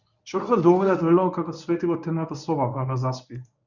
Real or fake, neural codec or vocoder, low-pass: fake; codec, 24 kHz, 0.9 kbps, WavTokenizer, medium speech release version 1; 7.2 kHz